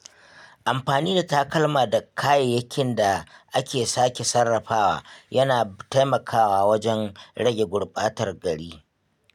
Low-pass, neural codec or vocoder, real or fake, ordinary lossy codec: 19.8 kHz; none; real; none